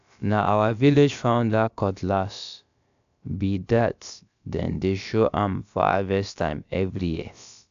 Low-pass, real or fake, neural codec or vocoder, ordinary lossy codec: 7.2 kHz; fake; codec, 16 kHz, about 1 kbps, DyCAST, with the encoder's durations; AAC, 96 kbps